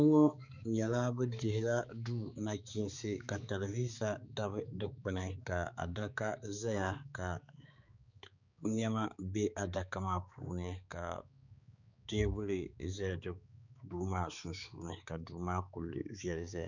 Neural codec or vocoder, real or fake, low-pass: codec, 16 kHz, 4 kbps, X-Codec, HuBERT features, trained on balanced general audio; fake; 7.2 kHz